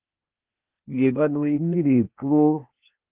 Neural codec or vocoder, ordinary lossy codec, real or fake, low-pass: codec, 16 kHz, 0.8 kbps, ZipCodec; Opus, 32 kbps; fake; 3.6 kHz